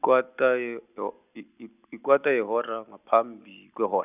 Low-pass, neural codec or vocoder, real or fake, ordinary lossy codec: 3.6 kHz; none; real; none